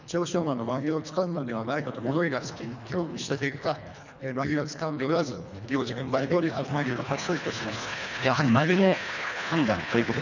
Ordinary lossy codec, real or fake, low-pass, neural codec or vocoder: none; fake; 7.2 kHz; codec, 24 kHz, 1.5 kbps, HILCodec